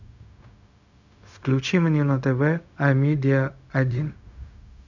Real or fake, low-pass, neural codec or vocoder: fake; 7.2 kHz; codec, 16 kHz, 0.4 kbps, LongCat-Audio-Codec